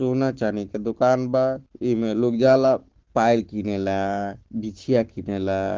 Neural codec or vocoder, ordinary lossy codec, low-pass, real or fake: codec, 44.1 kHz, 7.8 kbps, Pupu-Codec; Opus, 16 kbps; 7.2 kHz; fake